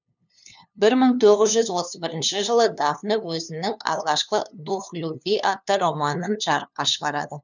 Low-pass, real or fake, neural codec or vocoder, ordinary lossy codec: 7.2 kHz; fake; codec, 16 kHz, 2 kbps, FunCodec, trained on LibriTTS, 25 frames a second; none